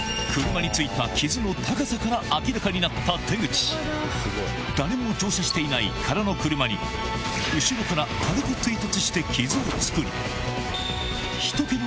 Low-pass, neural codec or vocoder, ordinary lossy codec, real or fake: none; none; none; real